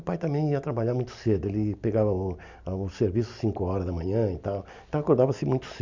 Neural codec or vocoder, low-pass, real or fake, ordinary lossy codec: none; 7.2 kHz; real; MP3, 64 kbps